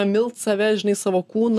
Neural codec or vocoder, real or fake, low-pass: none; real; 14.4 kHz